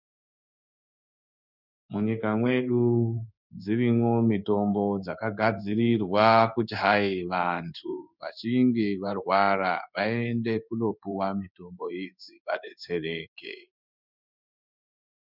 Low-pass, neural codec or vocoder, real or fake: 5.4 kHz; codec, 16 kHz in and 24 kHz out, 1 kbps, XY-Tokenizer; fake